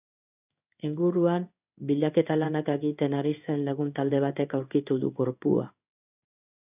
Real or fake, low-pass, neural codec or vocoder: fake; 3.6 kHz; codec, 16 kHz in and 24 kHz out, 1 kbps, XY-Tokenizer